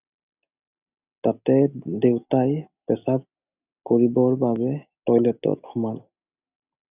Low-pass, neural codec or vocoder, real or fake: 3.6 kHz; none; real